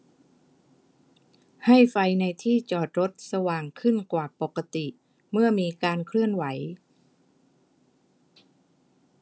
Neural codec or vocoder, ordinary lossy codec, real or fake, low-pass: none; none; real; none